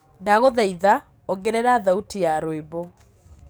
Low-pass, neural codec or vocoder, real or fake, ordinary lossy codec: none; codec, 44.1 kHz, 7.8 kbps, DAC; fake; none